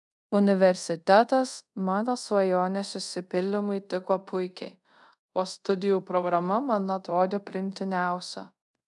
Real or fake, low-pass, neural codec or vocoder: fake; 10.8 kHz; codec, 24 kHz, 0.5 kbps, DualCodec